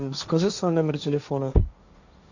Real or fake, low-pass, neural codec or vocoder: fake; 7.2 kHz; codec, 16 kHz, 1.1 kbps, Voila-Tokenizer